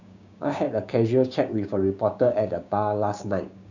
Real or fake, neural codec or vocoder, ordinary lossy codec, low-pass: fake; codec, 16 kHz, 6 kbps, DAC; none; 7.2 kHz